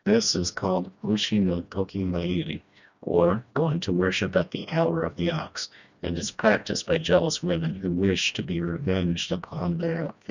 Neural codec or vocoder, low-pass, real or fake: codec, 16 kHz, 1 kbps, FreqCodec, smaller model; 7.2 kHz; fake